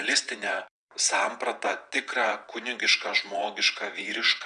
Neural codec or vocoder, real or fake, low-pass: vocoder, 22.05 kHz, 80 mel bands, WaveNeXt; fake; 9.9 kHz